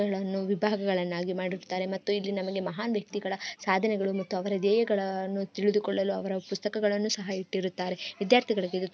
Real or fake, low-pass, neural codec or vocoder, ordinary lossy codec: real; none; none; none